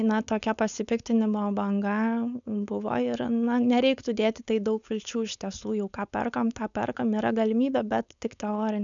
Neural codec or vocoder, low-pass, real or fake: codec, 16 kHz, 4.8 kbps, FACodec; 7.2 kHz; fake